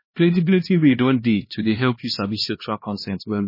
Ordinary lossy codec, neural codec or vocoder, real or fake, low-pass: MP3, 24 kbps; codec, 16 kHz, 1 kbps, X-Codec, HuBERT features, trained on LibriSpeech; fake; 5.4 kHz